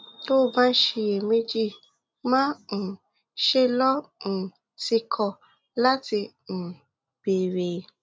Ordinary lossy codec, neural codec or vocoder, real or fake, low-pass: none; none; real; none